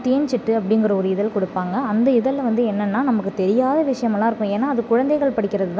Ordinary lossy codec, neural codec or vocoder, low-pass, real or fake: none; none; none; real